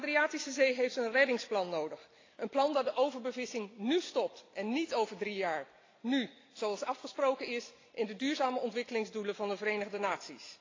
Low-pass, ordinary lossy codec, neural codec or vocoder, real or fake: 7.2 kHz; AAC, 32 kbps; none; real